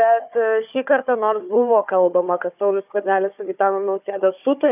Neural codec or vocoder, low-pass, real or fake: codec, 16 kHz, 4 kbps, FunCodec, trained on Chinese and English, 50 frames a second; 3.6 kHz; fake